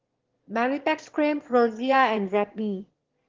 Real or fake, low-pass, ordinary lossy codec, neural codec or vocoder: fake; 7.2 kHz; Opus, 16 kbps; autoencoder, 22.05 kHz, a latent of 192 numbers a frame, VITS, trained on one speaker